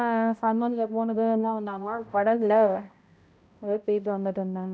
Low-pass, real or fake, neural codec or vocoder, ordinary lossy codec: none; fake; codec, 16 kHz, 0.5 kbps, X-Codec, HuBERT features, trained on balanced general audio; none